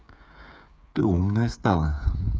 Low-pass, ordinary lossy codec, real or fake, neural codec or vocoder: none; none; fake; codec, 16 kHz, 8 kbps, FreqCodec, smaller model